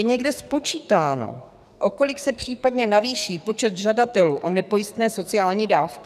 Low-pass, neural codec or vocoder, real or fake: 14.4 kHz; codec, 44.1 kHz, 2.6 kbps, SNAC; fake